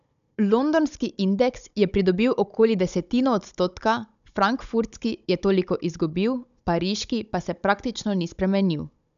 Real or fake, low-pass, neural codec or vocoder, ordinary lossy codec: fake; 7.2 kHz; codec, 16 kHz, 16 kbps, FunCodec, trained on Chinese and English, 50 frames a second; AAC, 96 kbps